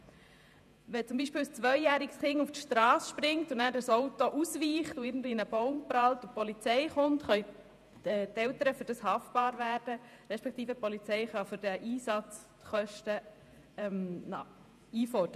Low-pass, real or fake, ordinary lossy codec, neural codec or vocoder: 14.4 kHz; fake; none; vocoder, 48 kHz, 128 mel bands, Vocos